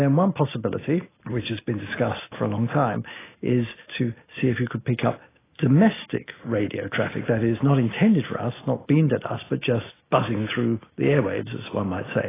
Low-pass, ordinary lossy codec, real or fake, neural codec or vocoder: 3.6 kHz; AAC, 16 kbps; real; none